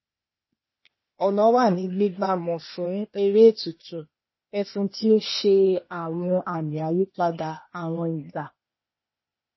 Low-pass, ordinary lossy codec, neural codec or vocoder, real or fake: 7.2 kHz; MP3, 24 kbps; codec, 16 kHz, 0.8 kbps, ZipCodec; fake